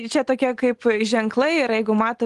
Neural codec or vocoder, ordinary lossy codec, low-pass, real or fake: none; Opus, 24 kbps; 10.8 kHz; real